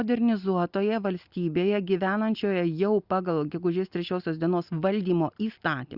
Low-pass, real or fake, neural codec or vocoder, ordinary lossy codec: 5.4 kHz; real; none; Opus, 64 kbps